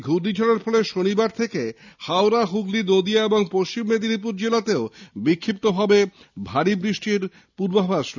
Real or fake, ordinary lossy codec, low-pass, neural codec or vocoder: real; none; 7.2 kHz; none